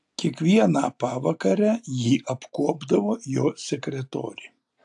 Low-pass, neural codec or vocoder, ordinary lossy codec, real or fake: 10.8 kHz; none; AAC, 64 kbps; real